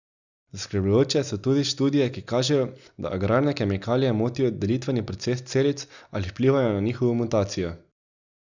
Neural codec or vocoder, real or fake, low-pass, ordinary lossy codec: none; real; 7.2 kHz; none